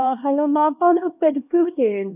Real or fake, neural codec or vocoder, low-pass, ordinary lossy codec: fake; codec, 16 kHz, 1 kbps, X-Codec, HuBERT features, trained on LibriSpeech; 3.6 kHz; none